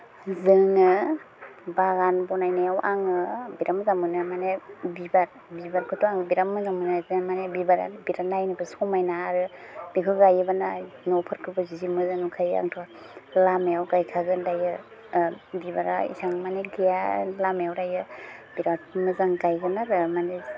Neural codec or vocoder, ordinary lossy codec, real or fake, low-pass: none; none; real; none